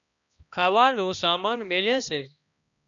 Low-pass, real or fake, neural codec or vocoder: 7.2 kHz; fake; codec, 16 kHz, 1 kbps, X-Codec, HuBERT features, trained on balanced general audio